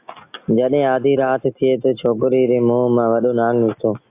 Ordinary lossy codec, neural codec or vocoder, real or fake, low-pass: AAC, 24 kbps; none; real; 3.6 kHz